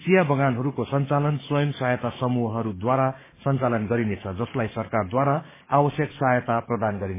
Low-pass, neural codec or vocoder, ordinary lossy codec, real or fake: 3.6 kHz; codec, 44.1 kHz, 7.8 kbps, DAC; MP3, 16 kbps; fake